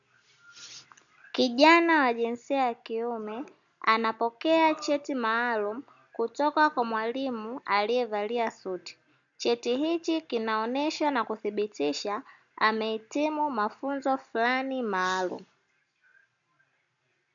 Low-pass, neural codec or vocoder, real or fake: 7.2 kHz; none; real